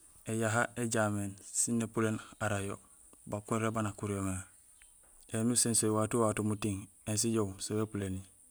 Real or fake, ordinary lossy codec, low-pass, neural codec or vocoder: real; none; none; none